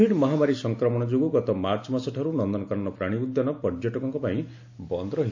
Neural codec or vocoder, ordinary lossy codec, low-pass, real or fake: none; AAC, 48 kbps; 7.2 kHz; real